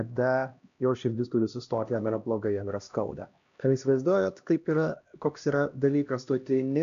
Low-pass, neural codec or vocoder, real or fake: 7.2 kHz; codec, 16 kHz, 1 kbps, X-Codec, HuBERT features, trained on LibriSpeech; fake